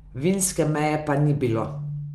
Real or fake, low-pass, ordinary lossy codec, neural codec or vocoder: real; 14.4 kHz; Opus, 32 kbps; none